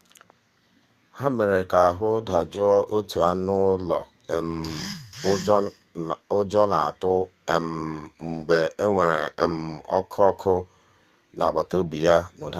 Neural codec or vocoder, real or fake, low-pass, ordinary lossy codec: codec, 32 kHz, 1.9 kbps, SNAC; fake; 14.4 kHz; Opus, 64 kbps